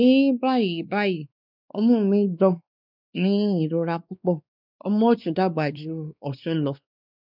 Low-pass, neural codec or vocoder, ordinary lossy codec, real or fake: 5.4 kHz; codec, 16 kHz, 2 kbps, X-Codec, WavLM features, trained on Multilingual LibriSpeech; none; fake